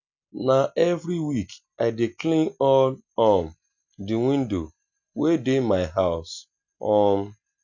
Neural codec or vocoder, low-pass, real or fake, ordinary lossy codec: none; 7.2 kHz; real; none